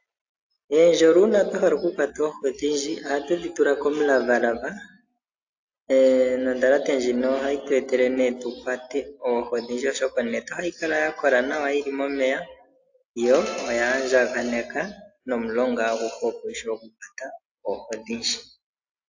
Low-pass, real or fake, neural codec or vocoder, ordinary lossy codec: 7.2 kHz; real; none; AAC, 48 kbps